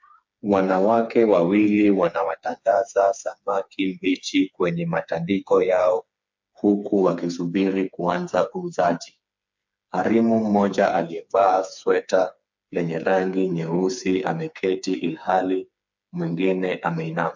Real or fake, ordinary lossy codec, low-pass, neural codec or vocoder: fake; MP3, 48 kbps; 7.2 kHz; codec, 16 kHz, 4 kbps, FreqCodec, smaller model